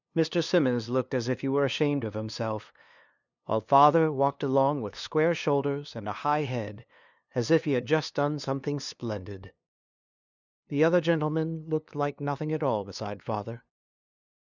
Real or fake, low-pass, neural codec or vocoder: fake; 7.2 kHz; codec, 16 kHz, 2 kbps, FunCodec, trained on LibriTTS, 25 frames a second